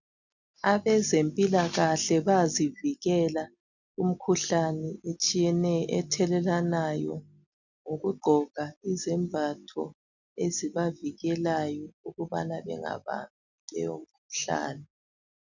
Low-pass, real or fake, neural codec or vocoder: 7.2 kHz; real; none